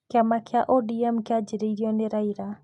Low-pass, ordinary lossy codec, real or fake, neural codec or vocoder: 10.8 kHz; AAC, 64 kbps; real; none